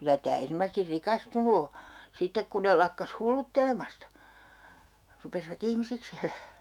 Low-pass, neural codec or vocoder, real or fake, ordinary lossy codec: 19.8 kHz; autoencoder, 48 kHz, 128 numbers a frame, DAC-VAE, trained on Japanese speech; fake; none